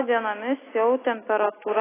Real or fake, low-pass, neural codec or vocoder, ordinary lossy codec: real; 3.6 kHz; none; AAC, 16 kbps